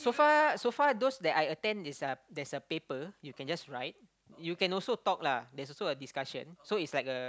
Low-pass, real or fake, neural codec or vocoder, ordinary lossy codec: none; real; none; none